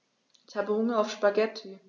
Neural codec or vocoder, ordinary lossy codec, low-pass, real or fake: none; none; 7.2 kHz; real